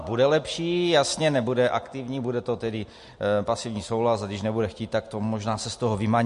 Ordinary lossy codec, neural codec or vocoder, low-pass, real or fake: MP3, 48 kbps; none; 14.4 kHz; real